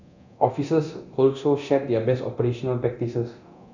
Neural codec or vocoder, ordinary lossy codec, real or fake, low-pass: codec, 24 kHz, 0.9 kbps, DualCodec; none; fake; 7.2 kHz